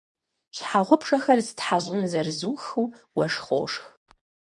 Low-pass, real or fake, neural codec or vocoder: 10.8 kHz; fake; codec, 24 kHz, 0.9 kbps, WavTokenizer, medium speech release version 2